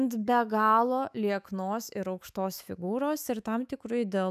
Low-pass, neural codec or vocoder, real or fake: 14.4 kHz; autoencoder, 48 kHz, 128 numbers a frame, DAC-VAE, trained on Japanese speech; fake